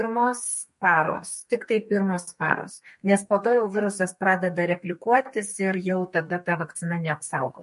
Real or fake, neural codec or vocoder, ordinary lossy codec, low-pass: fake; codec, 32 kHz, 1.9 kbps, SNAC; MP3, 48 kbps; 14.4 kHz